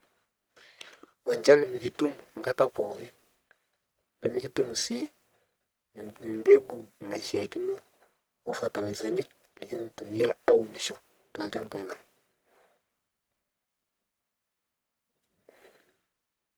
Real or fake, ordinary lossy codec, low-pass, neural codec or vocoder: fake; none; none; codec, 44.1 kHz, 1.7 kbps, Pupu-Codec